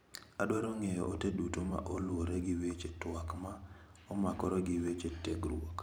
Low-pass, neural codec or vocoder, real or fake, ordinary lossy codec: none; vocoder, 44.1 kHz, 128 mel bands every 512 samples, BigVGAN v2; fake; none